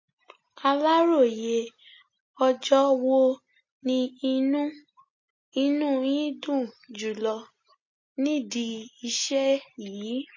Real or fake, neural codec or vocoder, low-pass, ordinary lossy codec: real; none; 7.2 kHz; MP3, 32 kbps